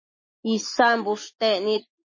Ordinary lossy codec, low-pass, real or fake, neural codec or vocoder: MP3, 32 kbps; 7.2 kHz; real; none